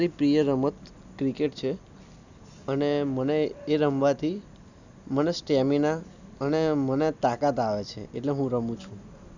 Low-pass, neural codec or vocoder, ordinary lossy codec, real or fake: 7.2 kHz; none; none; real